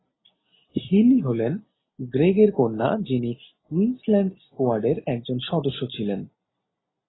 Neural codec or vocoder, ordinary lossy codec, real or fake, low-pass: none; AAC, 16 kbps; real; 7.2 kHz